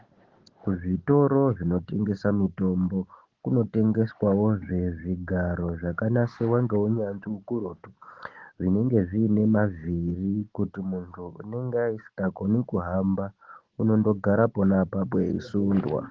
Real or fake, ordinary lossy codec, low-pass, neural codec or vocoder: real; Opus, 16 kbps; 7.2 kHz; none